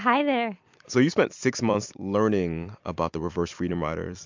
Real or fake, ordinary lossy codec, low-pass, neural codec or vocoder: fake; MP3, 64 kbps; 7.2 kHz; vocoder, 44.1 kHz, 128 mel bands every 256 samples, BigVGAN v2